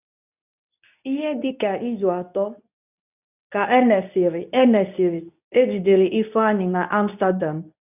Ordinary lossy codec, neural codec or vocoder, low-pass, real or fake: none; codec, 24 kHz, 0.9 kbps, WavTokenizer, medium speech release version 2; 3.6 kHz; fake